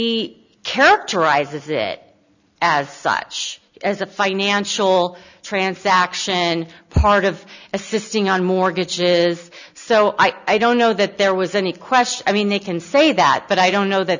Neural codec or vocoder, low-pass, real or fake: none; 7.2 kHz; real